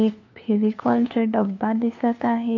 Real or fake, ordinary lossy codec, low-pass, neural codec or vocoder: fake; AAC, 48 kbps; 7.2 kHz; codec, 16 kHz, 2 kbps, FunCodec, trained on LibriTTS, 25 frames a second